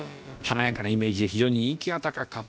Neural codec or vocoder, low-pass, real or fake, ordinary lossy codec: codec, 16 kHz, about 1 kbps, DyCAST, with the encoder's durations; none; fake; none